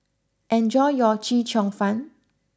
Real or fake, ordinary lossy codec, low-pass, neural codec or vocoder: real; none; none; none